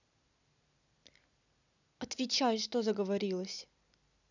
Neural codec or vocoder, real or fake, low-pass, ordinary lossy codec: none; real; 7.2 kHz; none